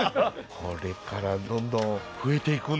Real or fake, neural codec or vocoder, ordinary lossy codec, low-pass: real; none; none; none